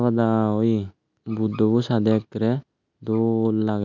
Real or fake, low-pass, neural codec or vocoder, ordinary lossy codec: real; 7.2 kHz; none; none